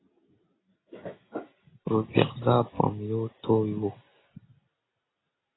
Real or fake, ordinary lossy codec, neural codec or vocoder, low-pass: real; AAC, 16 kbps; none; 7.2 kHz